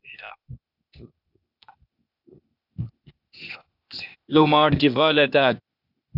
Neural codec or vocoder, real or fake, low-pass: codec, 16 kHz, 0.8 kbps, ZipCodec; fake; 5.4 kHz